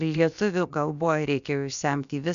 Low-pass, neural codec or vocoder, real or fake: 7.2 kHz; codec, 16 kHz, about 1 kbps, DyCAST, with the encoder's durations; fake